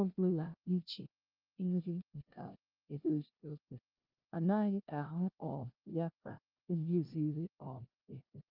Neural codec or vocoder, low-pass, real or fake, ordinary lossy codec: codec, 16 kHz, 0.5 kbps, FunCodec, trained on LibriTTS, 25 frames a second; 5.4 kHz; fake; Opus, 24 kbps